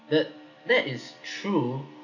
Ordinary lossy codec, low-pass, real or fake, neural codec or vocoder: none; 7.2 kHz; real; none